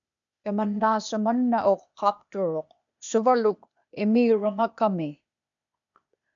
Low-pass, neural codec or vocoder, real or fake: 7.2 kHz; codec, 16 kHz, 0.8 kbps, ZipCodec; fake